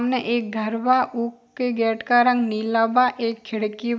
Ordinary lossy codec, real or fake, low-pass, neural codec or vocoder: none; real; none; none